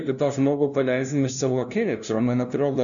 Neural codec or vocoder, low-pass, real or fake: codec, 16 kHz, 0.5 kbps, FunCodec, trained on LibriTTS, 25 frames a second; 7.2 kHz; fake